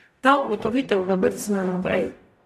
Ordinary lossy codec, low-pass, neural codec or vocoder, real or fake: AAC, 96 kbps; 14.4 kHz; codec, 44.1 kHz, 0.9 kbps, DAC; fake